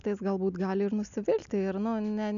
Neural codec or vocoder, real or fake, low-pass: none; real; 7.2 kHz